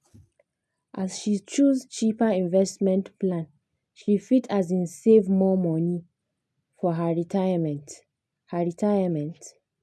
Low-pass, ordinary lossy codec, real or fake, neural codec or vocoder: none; none; real; none